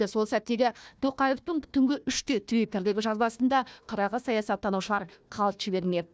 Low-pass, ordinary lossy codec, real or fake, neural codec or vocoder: none; none; fake; codec, 16 kHz, 1 kbps, FunCodec, trained on Chinese and English, 50 frames a second